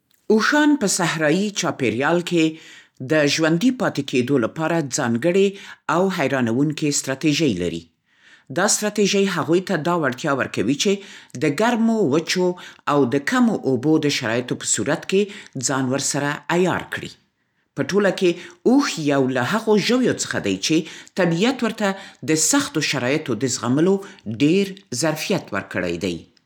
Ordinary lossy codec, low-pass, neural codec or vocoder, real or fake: none; 19.8 kHz; none; real